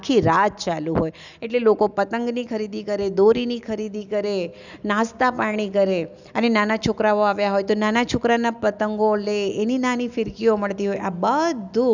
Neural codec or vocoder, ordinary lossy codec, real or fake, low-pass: none; none; real; 7.2 kHz